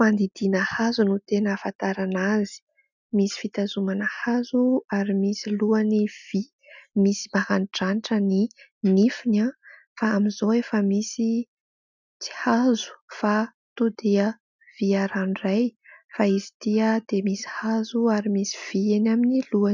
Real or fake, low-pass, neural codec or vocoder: real; 7.2 kHz; none